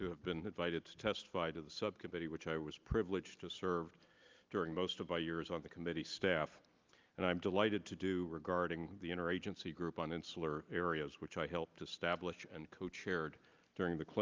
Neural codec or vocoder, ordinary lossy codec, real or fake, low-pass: none; Opus, 24 kbps; real; 7.2 kHz